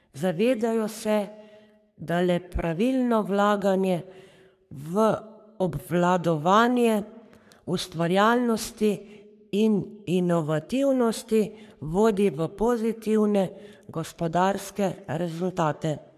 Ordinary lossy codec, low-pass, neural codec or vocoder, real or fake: none; 14.4 kHz; codec, 44.1 kHz, 3.4 kbps, Pupu-Codec; fake